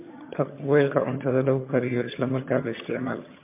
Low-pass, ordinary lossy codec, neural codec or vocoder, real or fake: 3.6 kHz; MP3, 24 kbps; vocoder, 22.05 kHz, 80 mel bands, HiFi-GAN; fake